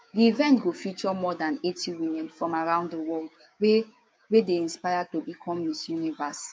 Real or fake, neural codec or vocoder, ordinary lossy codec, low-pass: fake; codec, 16 kHz, 6 kbps, DAC; none; none